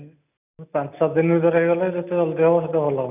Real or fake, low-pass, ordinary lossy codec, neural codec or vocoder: real; 3.6 kHz; none; none